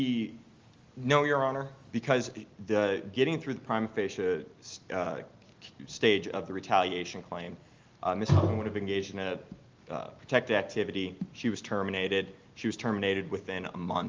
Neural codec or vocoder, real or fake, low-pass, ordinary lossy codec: none; real; 7.2 kHz; Opus, 32 kbps